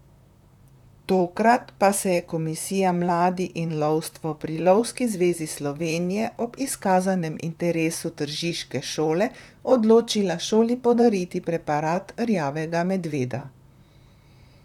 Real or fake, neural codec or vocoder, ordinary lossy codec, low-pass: fake; vocoder, 44.1 kHz, 128 mel bands, Pupu-Vocoder; none; 19.8 kHz